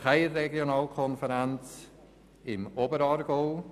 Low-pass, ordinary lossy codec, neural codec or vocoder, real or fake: 14.4 kHz; none; none; real